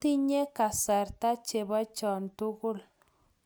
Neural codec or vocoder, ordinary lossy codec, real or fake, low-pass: none; none; real; none